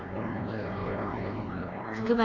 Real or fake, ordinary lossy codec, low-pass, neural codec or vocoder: fake; none; 7.2 kHz; codec, 16 kHz, 2 kbps, X-Codec, WavLM features, trained on Multilingual LibriSpeech